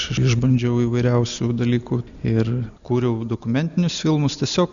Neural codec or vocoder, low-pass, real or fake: none; 7.2 kHz; real